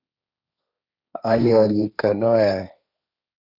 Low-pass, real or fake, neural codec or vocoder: 5.4 kHz; fake; codec, 16 kHz, 1.1 kbps, Voila-Tokenizer